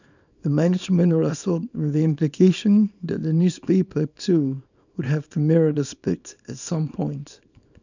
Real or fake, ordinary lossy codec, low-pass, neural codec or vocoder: fake; none; 7.2 kHz; codec, 24 kHz, 0.9 kbps, WavTokenizer, small release